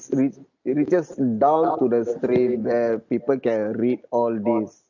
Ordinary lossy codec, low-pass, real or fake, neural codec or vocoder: none; 7.2 kHz; real; none